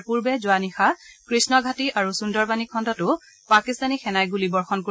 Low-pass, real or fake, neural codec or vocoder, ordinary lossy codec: none; real; none; none